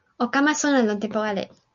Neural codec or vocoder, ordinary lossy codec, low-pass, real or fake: none; MP3, 48 kbps; 7.2 kHz; real